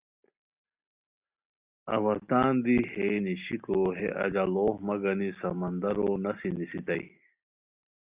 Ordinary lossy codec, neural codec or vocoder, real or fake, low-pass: Opus, 64 kbps; none; real; 3.6 kHz